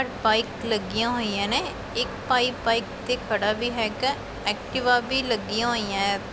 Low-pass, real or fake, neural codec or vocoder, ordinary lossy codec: none; real; none; none